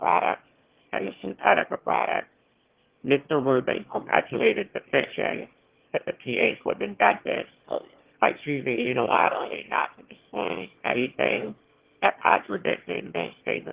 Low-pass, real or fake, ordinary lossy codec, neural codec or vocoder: 3.6 kHz; fake; Opus, 16 kbps; autoencoder, 22.05 kHz, a latent of 192 numbers a frame, VITS, trained on one speaker